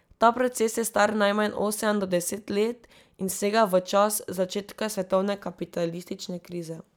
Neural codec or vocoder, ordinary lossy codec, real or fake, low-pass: none; none; real; none